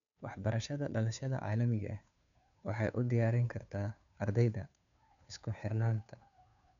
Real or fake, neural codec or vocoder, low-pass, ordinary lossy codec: fake; codec, 16 kHz, 2 kbps, FunCodec, trained on Chinese and English, 25 frames a second; 7.2 kHz; none